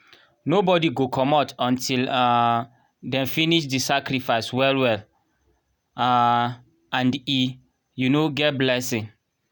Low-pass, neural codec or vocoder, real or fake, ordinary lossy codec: none; none; real; none